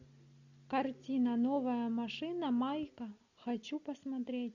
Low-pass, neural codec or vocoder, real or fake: 7.2 kHz; none; real